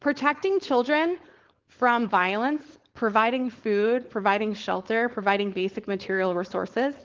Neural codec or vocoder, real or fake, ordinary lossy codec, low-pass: codec, 16 kHz, 4.8 kbps, FACodec; fake; Opus, 16 kbps; 7.2 kHz